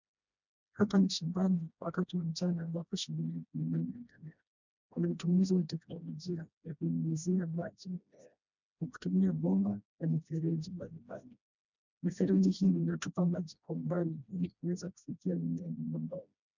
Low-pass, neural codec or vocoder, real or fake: 7.2 kHz; codec, 16 kHz, 1 kbps, FreqCodec, smaller model; fake